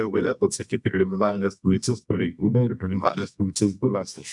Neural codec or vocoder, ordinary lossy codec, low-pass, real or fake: codec, 24 kHz, 0.9 kbps, WavTokenizer, medium music audio release; MP3, 96 kbps; 10.8 kHz; fake